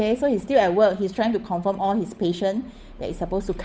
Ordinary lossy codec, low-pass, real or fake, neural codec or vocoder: none; none; fake; codec, 16 kHz, 8 kbps, FunCodec, trained on Chinese and English, 25 frames a second